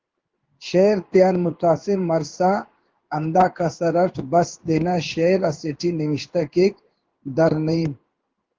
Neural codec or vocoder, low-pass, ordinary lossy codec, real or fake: codec, 16 kHz in and 24 kHz out, 1 kbps, XY-Tokenizer; 7.2 kHz; Opus, 16 kbps; fake